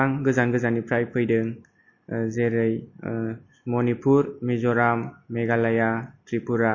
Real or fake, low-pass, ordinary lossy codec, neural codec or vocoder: real; 7.2 kHz; MP3, 32 kbps; none